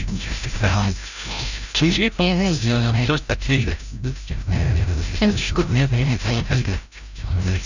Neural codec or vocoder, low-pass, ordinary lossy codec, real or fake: codec, 16 kHz, 0.5 kbps, FreqCodec, larger model; 7.2 kHz; none; fake